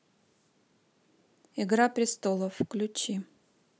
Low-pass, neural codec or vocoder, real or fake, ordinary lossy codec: none; none; real; none